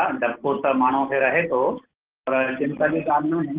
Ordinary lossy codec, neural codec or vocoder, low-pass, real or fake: Opus, 16 kbps; none; 3.6 kHz; real